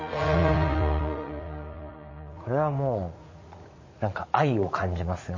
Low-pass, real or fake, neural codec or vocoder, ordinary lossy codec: 7.2 kHz; fake; vocoder, 44.1 kHz, 80 mel bands, Vocos; MP3, 32 kbps